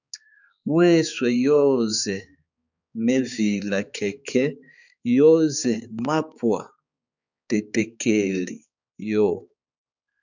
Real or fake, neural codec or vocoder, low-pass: fake; codec, 16 kHz, 4 kbps, X-Codec, HuBERT features, trained on balanced general audio; 7.2 kHz